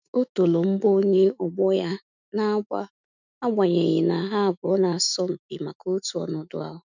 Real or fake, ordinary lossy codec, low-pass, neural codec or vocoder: fake; none; 7.2 kHz; autoencoder, 48 kHz, 128 numbers a frame, DAC-VAE, trained on Japanese speech